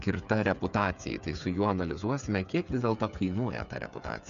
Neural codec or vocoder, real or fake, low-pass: codec, 16 kHz, 8 kbps, FreqCodec, smaller model; fake; 7.2 kHz